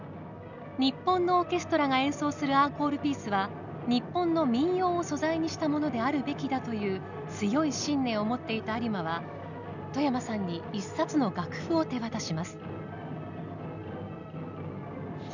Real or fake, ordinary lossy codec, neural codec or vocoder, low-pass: real; none; none; 7.2 kHz